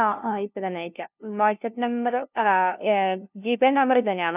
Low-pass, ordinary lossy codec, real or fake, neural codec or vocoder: 3.6 kHz; none; fake; codec, 16 kHz, 0.5 kbps, FunCodec, trained on LibriTTS, 25 frames a second